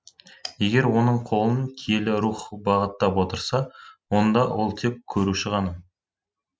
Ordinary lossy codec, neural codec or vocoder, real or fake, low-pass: none; none; real; none